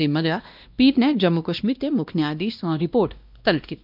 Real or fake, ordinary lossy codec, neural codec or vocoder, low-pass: fake; none; codec, 16 kHz, 1 kbps, X-Codec, WavLM features, trained on Multilingual LibriSpeech; 5.4 kHz